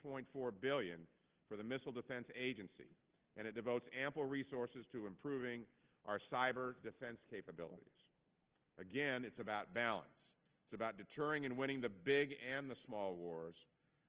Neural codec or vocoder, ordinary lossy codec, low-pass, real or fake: none; Opus, 16 kbps; 3.6 kHz; real